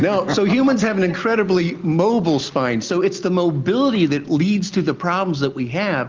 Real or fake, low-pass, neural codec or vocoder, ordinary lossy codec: real; 7.2 kHz; none; Opus, 32 kbps